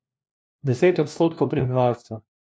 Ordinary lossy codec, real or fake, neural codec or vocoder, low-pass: none; fake; codec, 16 kHz, 1 kbps, FunCodec, trained on LibriTTS, 50 frames a second; none